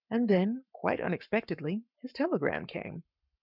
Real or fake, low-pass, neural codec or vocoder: fake; 5.4 kHz; codec, 44.1 kHz, 7.8 kbps, Pupu-Codec